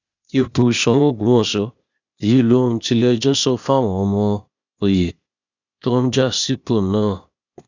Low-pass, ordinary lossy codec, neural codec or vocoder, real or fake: 7.2 kHz; none; codec, 16 kHz, 0.8 kbps, ZipCodec; fake